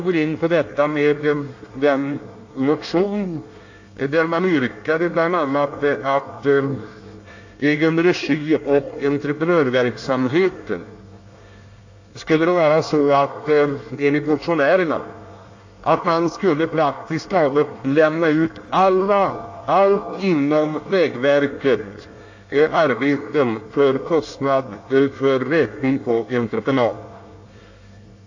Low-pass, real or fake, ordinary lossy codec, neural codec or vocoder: 7.2 kHz; fake; AAC, 48 kbps; codec, 24 kHz, 1 kbps, SNAC